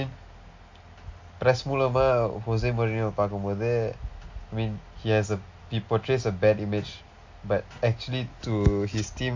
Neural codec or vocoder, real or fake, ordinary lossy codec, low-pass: none; real; MP3, 48 kbps; 7.2 kHz